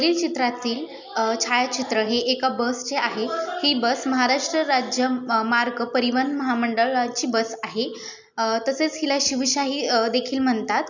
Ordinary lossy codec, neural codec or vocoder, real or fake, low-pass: none; none; real; 7.2 kHz